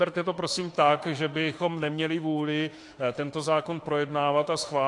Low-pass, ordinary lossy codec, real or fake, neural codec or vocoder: 10.8 kHz; AAC, 48 kbps; fake; autoencoder, 48 kHz, 32 numbers a frame, DAC-VAE, trained on Japanese speech